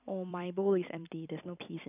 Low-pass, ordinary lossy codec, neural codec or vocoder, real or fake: 3.6 kHz; none; vocoder, 44.1 kHz, 128 mel bands every 256 samples, BigVGAN v2; fake